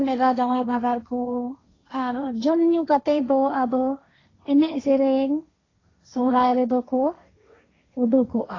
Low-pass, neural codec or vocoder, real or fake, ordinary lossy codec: 7.2 kHz; codec, 16 kHz, 1.1 kbps, Voila-Tokenizer; fake; AAC, 32 kbps